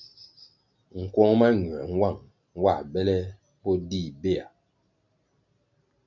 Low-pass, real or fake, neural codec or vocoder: 7.2 kHz; real; none